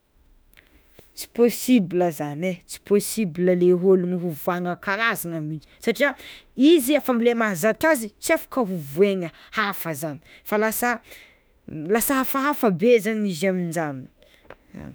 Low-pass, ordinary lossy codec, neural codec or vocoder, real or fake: none; none; autoencoder, 48 kHz, 32 numbers a frame, DAC-VAE, trained on Japanese speech; fake